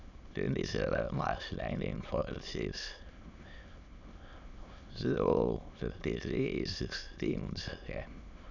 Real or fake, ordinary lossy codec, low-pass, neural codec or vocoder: fake; none; 7.2 kHz; autoencoder, 22.05 kHz, a latent of 192 numbers a frame, VITS, trained on many speakers